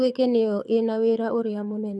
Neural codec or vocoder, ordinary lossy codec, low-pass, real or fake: codec, 24 kHz, 6 kbps, HILCodec; none; none; fake